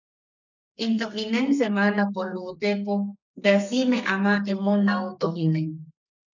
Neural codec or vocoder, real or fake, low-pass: codec, 32 kHz, 1.9 kbps, SNAC; fake; 7.2 kHz